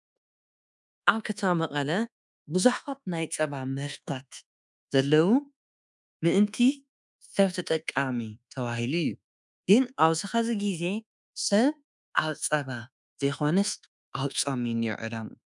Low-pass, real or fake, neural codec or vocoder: 10.8 kHz; fake; codec, 24 kHz, 1.2 kbps, DualCodec